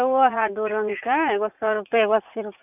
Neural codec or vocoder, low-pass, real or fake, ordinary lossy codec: vocoder, 44.1 kHz, 80 mel bands, Vocos; 3.6 kHz; fake; none